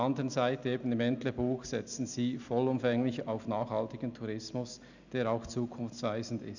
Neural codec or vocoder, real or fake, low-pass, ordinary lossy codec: none; real; 7.2 kHz; none